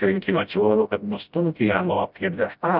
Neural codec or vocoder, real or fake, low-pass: codec, 16 kHz, 0.5 kbps, FreqCodec, smaller model; fake; 5.4 kHz